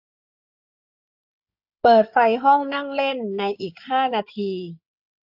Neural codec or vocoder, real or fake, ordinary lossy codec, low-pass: codec, 16 kHz in and 24 kHz out, 2.2 kbps, FireRedTTS-2 codec; fake; AAC, 48 kbps; 5.4 kHz